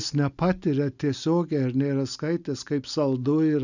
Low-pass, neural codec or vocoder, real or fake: 7.2 kHz; none; real